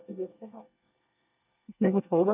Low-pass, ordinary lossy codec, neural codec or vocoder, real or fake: 3.6 kHz; none; codec, 24 kHz, 1 kbps, SNAC; fake